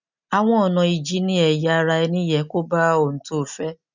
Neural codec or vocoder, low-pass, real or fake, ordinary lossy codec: none; 7.2 kHz; real; none